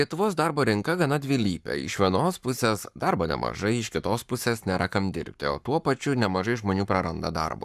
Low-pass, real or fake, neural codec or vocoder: 14.4 kHz; fake; codec, 44.1 kHz, 7.8 kbps, Pupu-Codec